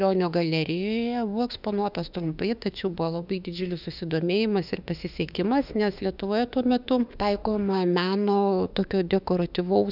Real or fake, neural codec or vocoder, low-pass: fake; autoencoder, 48 kHz, 32 numbers a frame, DAC-VAE, trained on Japanese speech; 5.4 kHz